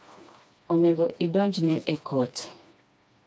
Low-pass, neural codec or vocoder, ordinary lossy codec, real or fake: none; codec, 16 kHz, 2 kbps, FreqCodec, smaller model; none; fake